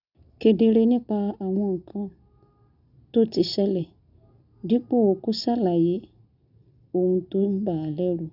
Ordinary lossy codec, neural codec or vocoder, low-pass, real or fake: none; none; 5.4 kHz; real